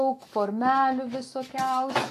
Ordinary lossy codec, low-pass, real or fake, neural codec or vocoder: AAC, 48 kbps; 14.4 kHz; fake; autoencoder, 48 kHz, 128 numbers a frame, DAC-VAE, trained on Japanese speech